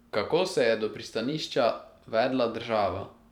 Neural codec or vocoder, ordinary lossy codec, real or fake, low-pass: vocoder, 44.1 kHz, 128 mel bands every 512 samples, BigVGAN v2; none; fake; 19.8 kHz